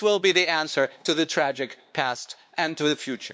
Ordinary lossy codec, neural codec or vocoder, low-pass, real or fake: none; codec, 16 kHz, 2 kbps, X-Codec, WavLM features, trained on Multilingual LibriSpeech; none; fake